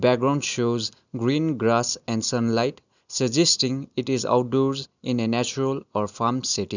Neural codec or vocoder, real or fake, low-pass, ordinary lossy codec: none; real; 7.2 kHz; none